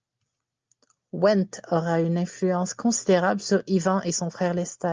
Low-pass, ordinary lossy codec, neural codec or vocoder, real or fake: 7.2 kHz; Opus, 24 kbps; none; real